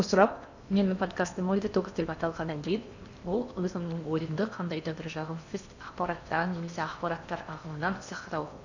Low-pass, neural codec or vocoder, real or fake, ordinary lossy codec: 7.2 kHz; codec, 16 kHz in and 24 kHz out, 0.8 kbps, FocalCodec, streaming, 65536 codes; fake; none